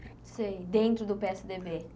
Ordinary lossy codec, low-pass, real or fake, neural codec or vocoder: none; none; real; none